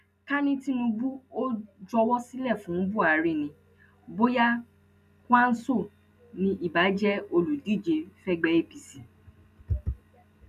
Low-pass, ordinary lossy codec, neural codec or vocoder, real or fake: 14.4 kHz; none; none; real